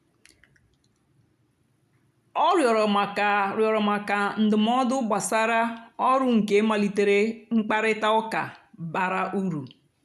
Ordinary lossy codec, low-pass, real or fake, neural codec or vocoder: none; 14.4 kHz; real; none